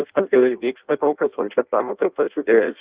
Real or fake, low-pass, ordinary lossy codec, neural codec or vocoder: fake; 3.6 kHz; Opus, 32 kbps; codec, 16 kHz in and 24 kHz out, 0.6 kbps, FireRedTTS-2 codec